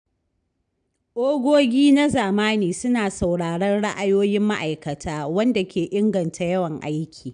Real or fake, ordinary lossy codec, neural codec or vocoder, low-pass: real; none; none; 9.9 kHz